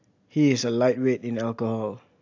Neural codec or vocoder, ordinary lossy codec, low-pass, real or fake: none; AAC, 48 kbps; 7.2 kHz; real